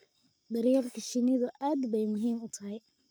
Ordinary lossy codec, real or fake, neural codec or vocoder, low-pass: none; fake; codec, 44.1 kHz, 7.8 kbps, Pupu-Codec; none